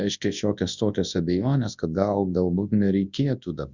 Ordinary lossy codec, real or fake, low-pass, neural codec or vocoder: Opus, 64 kbps; fake; 7.2 kHz; codec, 24 kHz, 0.9 kbps, WavTokenizer, large speech release